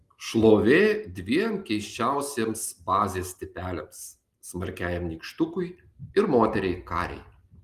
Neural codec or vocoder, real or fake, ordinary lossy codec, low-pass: none; real; Opus, 24 kbps; 14.4 kHz